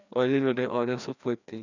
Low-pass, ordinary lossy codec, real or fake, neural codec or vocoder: 7.2 kHz; none; fake; codec, 16 kHz, 2 kbps, FreqCodec, larger model